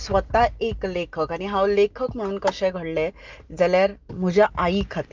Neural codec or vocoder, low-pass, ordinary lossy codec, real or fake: none; 7.2 kHz; Opus, 32 kbps; real